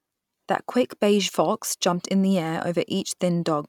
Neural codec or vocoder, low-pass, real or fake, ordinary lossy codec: none; 19.8 kHz; real; none